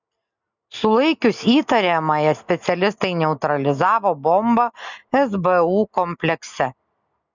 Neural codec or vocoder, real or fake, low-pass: none; real; 7.2 kHz